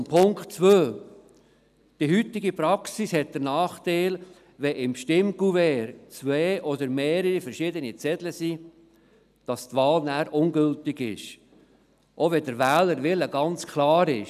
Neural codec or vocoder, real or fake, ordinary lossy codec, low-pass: none; real; none; 14.4 kHz